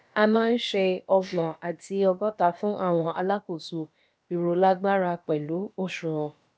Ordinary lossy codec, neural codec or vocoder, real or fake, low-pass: none; codec, 16 kHz, about 1 kbps, DyCAST, with the encoder's durations; fake; none